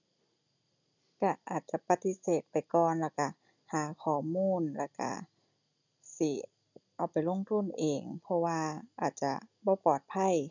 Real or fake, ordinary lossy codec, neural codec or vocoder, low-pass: real; none; none; 7.2 kHz